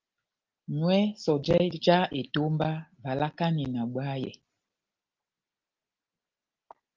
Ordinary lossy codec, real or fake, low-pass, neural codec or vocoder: Opus, 32 kbps; real; 7.2 kHz; none